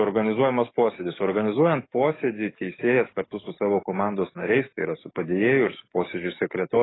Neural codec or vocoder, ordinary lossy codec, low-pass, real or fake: codec, 44.1 kHz, 7.8 kbps, DAC; AAC, 16 kbps; 7.2 kHz; fake